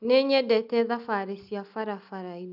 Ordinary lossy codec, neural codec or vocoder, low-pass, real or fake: none; none; 5.4 kHz; real